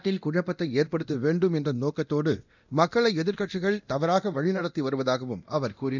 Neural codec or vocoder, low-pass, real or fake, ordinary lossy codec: codec, 24 kHz, 0.9 kbps, DualCodec; 7.2 kHz; fake; none